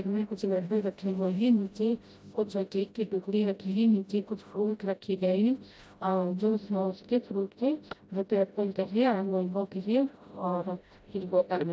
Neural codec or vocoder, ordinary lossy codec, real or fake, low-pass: codec, 16 kHz, 0.5 kbps, FreqCodec, smaller model; none; fake; none